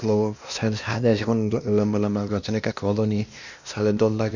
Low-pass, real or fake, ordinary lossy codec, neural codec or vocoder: 7.2 kHz; fake; none; codec, 16 kHz, 1 kbps, X-Codec, WavLM features, trained on Multilingual LibriSpeech